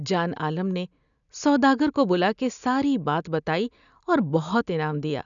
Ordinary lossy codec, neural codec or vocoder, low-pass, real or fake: none; none; 7.2 kHz; real